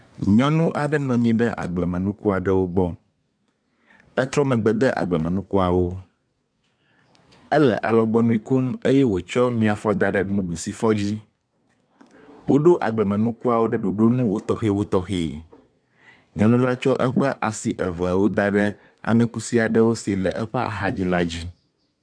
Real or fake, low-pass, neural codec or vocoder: fake; 9.9 kHz; codec, 24 kHz, 1 kbps, SNAC